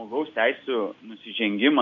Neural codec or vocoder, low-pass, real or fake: none; 7.2 kHz; real